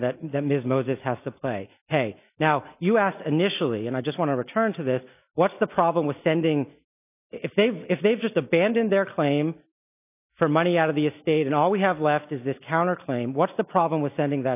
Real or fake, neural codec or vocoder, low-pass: real; none; 3.6 kHz